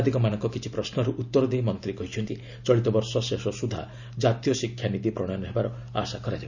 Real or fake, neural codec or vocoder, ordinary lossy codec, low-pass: real; none; none; 7.2 kHz